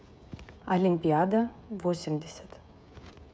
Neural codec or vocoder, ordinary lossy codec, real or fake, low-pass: codec, 16 kHz, 16 kbps, FreqCodec, smaller model; none; fake; none